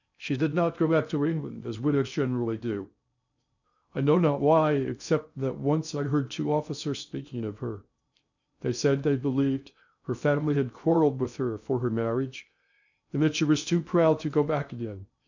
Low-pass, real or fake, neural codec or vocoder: 7.2 kHz; fake; codec, 16 kHz in and 24 kHz out, 0.6 kbps, FocalCodec, streaming, 2048 codes